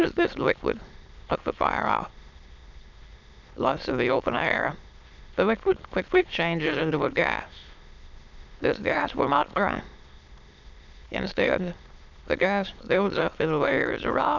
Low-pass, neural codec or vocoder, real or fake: 7.2 kHz; autoencoder, 22.05 kHz, a latent of 192 numbers a frame, VITS, trained on many speakers; fake